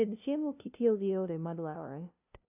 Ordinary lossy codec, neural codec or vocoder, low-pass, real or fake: none; codec, 16 kHz, 0.5 kbps, FunCodec, trained on LibriTTS, 25 frames a second; 3.6 kHz; fake